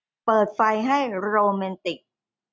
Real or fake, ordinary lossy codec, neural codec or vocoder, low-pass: real; none; none; none